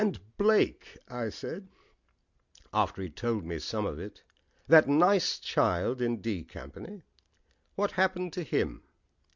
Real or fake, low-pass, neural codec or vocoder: real; 7.2 kHz; none